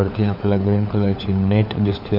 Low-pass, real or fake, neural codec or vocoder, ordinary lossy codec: 5.4 kHz; fake; codec, 16 kHz, 4 kbps, FunCodec, trained on LibriTTS, 50 frames a second; none